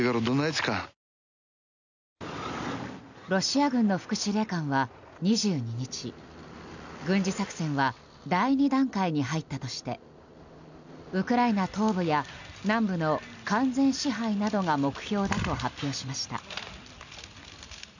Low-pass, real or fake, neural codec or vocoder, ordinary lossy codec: 7.2 kHz; real; none; none